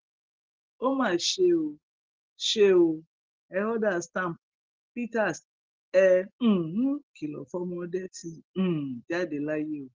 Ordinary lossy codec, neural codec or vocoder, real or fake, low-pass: Opus, 16 kbps; none; real; 7.2 kHz